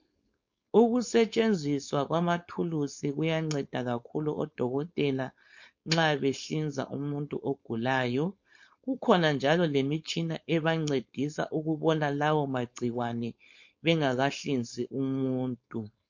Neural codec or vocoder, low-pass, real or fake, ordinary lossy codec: codec, 16 kHz, 4.8 kbps, FACodec; 7.2 kHz; fake; MP3, 48 kbps